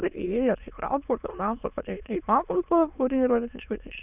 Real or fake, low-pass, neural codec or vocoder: fake; 3.6 kHz; autoencoder, 22.05 kHz, a latent of 192 numbers a frame, VITS, trained on many speakers